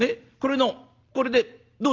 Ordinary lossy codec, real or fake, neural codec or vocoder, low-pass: Opus, 24 kbps; real; none; 7.2 kHz